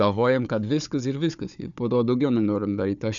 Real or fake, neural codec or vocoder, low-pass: fake; codec, 16 kHz, 4 kbps, FunCodec, trained on Chinese and English, 50 frames a second; 7.2 kHz